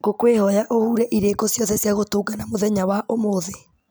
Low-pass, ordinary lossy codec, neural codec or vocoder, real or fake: none; none; none; real